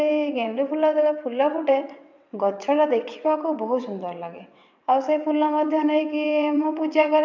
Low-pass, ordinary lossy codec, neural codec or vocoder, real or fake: 7.2 kHz; MP3, 64 kbps; vocoder, 22.05 kHz, 80 mel bands, WaveNeXt; fake